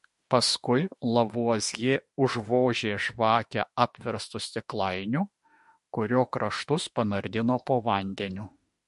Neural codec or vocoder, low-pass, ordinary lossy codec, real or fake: autoencoder, 48 kHz, 32 numbers a frame, DAC-VAE, trained on Japanese speech; 14.4 kHz; MP3, 48 kbps; fake